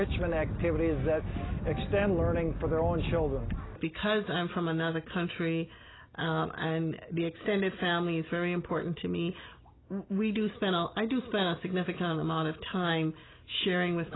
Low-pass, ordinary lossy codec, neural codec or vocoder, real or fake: 7.2 kHz; AAC, 16 kbps; none; real